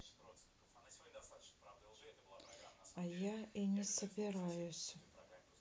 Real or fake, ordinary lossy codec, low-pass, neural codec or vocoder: real; none; none; none